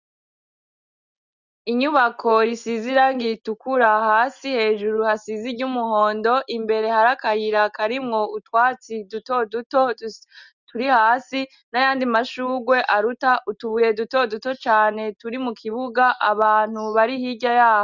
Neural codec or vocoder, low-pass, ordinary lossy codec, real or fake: none; 7.2 kHz; Opus, 64 kbps; real